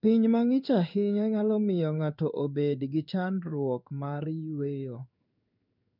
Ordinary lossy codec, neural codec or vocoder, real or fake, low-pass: none; codec, 16 kHz in and 24 kHz out, 1 kbps, XY-Tokenizer; fake; 5.4 kHz